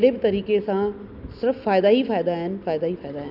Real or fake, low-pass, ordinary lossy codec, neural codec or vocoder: real; 5.4 kHz; none; none